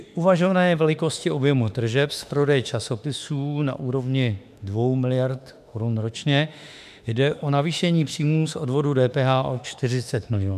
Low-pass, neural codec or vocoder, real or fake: 14.4 kHz; autoencoder, 48 kHz, 32 numbers a frame, DAC-VAE, trained on Japanese speech; fake